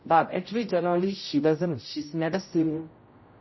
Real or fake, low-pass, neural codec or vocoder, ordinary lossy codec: fake; 7.2 kHz; codec, 16 kHz, 0.5 kbps, X-Codec, HuBERT features, trained on general audio; MP3, 24 kbps